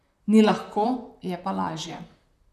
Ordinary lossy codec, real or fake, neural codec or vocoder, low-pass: none; fake; vocoder, 44.1 kHz, 128 mel bands, Pupu-Vocoder; 14.4 kHz